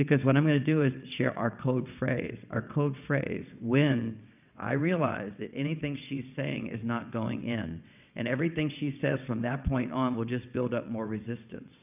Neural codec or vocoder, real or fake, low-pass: vocoder, 22.05 kHz, 80 mel bands, WaveNeXt; fake; 3.6 kHz